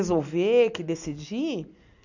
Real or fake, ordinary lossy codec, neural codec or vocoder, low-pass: real; none; none; 7.2 kHz